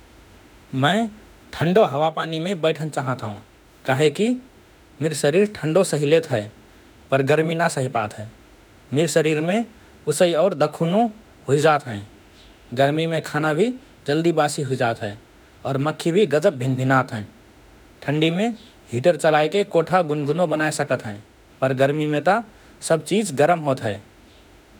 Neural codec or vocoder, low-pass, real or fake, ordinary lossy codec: autoencoder, 48 kHz, 32 numbers a frame, DAC-VAE, trained on Japanese speech; none; fake; none